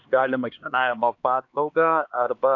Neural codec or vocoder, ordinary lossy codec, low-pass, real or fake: codec, 16 kHz, 2 kbps, X-Codec, HuBERT features, trained on LibriSpeech; AAC, 48 kbps; 7.2 kHz; fake